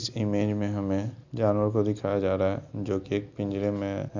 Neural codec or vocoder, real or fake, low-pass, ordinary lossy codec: none; real; 7.2 kHz; none